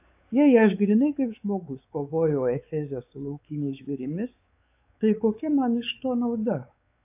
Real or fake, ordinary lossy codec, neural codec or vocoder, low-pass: fake; AAC, 32 kbps; codec, 16 kHz, 4 kbps, X-Codec, WavLM features, trained on Multilingual LibriSpeech; 3.6 kHz